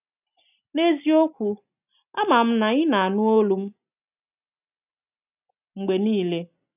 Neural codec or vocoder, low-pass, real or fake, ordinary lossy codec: none; 3.6 kHz; real; none